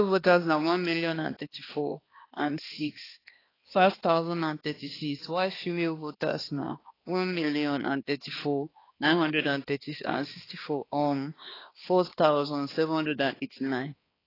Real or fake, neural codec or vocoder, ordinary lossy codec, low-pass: fake; codec, 16 kHz, 2 kbps, X-Codec, HuBERT features, trained on balanced general audio; AAC, 24 kbps; 5.4 kHz